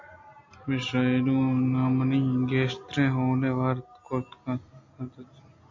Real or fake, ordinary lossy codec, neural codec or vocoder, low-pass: real; MP3, 48 kbps; none; 7.2 kHz